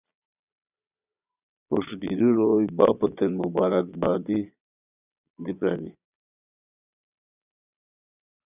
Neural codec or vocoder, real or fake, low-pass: vocoder, 22.05 kHz, 80 mel bands, Vocos; fake; 3.6 kHz